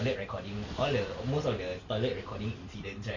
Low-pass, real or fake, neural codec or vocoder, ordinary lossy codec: 7.2 kHz; real; none; Opus, 64 kbps